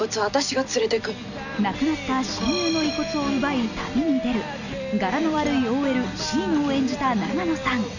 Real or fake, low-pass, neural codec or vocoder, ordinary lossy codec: real; 7.2 kHz; none; none